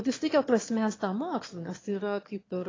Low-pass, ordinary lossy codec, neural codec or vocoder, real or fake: 7.2 kHz; AAC, 32 kbps; autoencoder, 22.05 kHz, a latent of 192 numbers a frame, VITS, trained on one speaker; fake